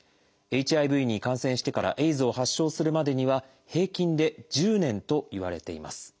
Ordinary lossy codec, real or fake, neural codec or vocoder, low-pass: none; real; none; none